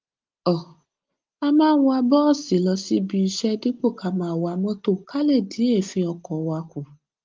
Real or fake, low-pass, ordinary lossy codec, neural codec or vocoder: real; 7.2 kHz; Opus, 32 kbps; none